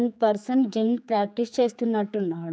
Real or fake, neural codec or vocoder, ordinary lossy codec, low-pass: fake; codec, 16 kHz, 4 kbps, X-Codec, HuBERT features, trained on general audio; none; none